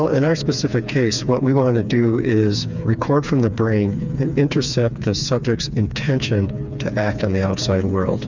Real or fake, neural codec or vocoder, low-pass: fake; codec, 16 kHz, 4 kbps, FreqCodec, smaller model; 7.2 kHz